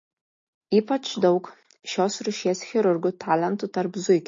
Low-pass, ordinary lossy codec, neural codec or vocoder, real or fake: 7.2 kHz; MP3, 32 kbps; none; real